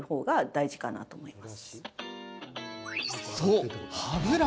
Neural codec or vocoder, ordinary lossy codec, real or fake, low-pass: none; none; real; none